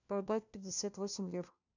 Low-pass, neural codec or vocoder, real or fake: 7.2 kHz; codec, 16 kHz, 1 kbps, FunCodec, trained on LibriTTS, 50 frames a second; fake